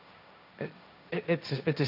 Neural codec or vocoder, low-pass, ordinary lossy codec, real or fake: codec, 16 kHz, 1.1 kbps, Voila-Tokenizer; 5.4 kHz; none; fake